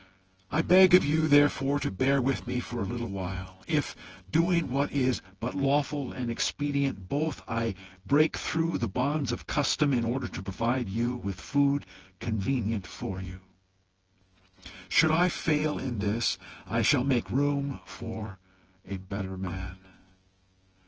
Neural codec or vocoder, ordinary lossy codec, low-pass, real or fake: vocoder, 24 kHz, 100 mel bands, Vocos; Opus, 16 kbps; 7.2 kHz; fake